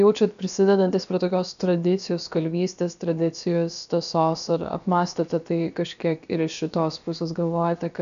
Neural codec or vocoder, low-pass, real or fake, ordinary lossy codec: codec, 16 kHz, about 1 kbps, DyCAST, with the encoder's durations; 7.2 kHz; fake; MP3, 96 kbps